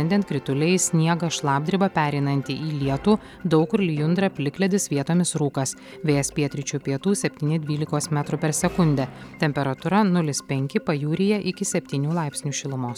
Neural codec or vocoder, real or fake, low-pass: none; real; 19.8 kHz